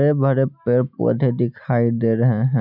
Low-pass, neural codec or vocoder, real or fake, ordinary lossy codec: 5.4 kHz; none; real; none